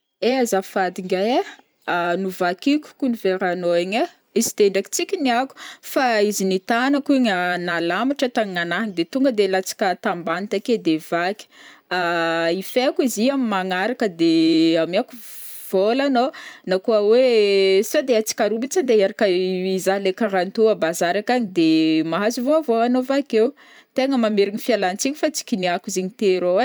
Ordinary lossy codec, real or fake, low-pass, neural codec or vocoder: none; fake; none; vocoder, 44.1 kHz, 128 mel bands every 512 samples, BigVGAN v2